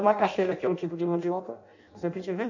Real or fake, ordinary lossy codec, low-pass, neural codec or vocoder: fake; none; 7.2 kHz; codec, 16 kHz in and 24 kHz out, 0.6 kbps, FireRedTTS-2 codec